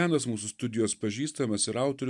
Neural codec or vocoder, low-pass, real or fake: none; 10.8 kHz; real